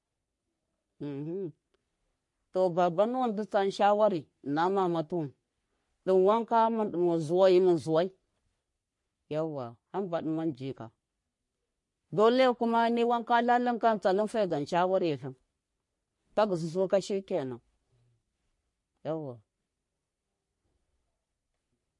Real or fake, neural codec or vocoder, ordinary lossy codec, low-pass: fake; codec, 44.1 kHz, 3.4 kbps, Pupu-Codec; MP3, 48 kbps; 10.8 kHz